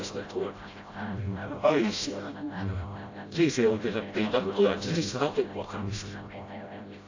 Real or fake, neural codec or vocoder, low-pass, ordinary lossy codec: fake; codec, 16 kHz, 0.5 kbps, FreqCodec, smaller model; 7.2 kHz; AAC, 48 kbps